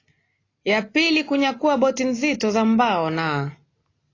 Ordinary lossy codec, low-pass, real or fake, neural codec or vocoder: AAC, 32 kbps; 7.2 kHz; real; none